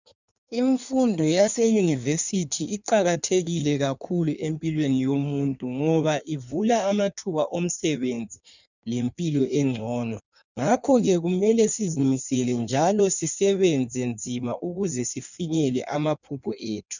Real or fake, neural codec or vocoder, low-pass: fake; codec, 16 kHz in and 24 kHz out, 1.1 kbps, FireRedTTS-2 codec; 7.2 kHz